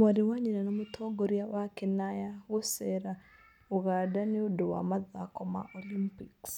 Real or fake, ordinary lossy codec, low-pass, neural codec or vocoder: real; none; 19.8 kHz; none